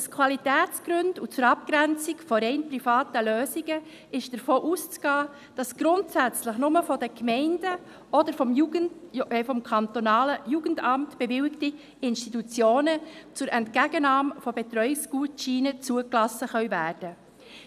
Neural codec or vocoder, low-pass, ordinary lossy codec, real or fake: none; 14.4 kHz; none; real